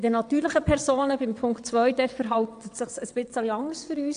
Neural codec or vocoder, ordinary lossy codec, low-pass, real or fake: vocoder, 22.05 kHz, 80 mel bands, WaveNeXt; AAC, 64 kbps; 9.9 kHz; fake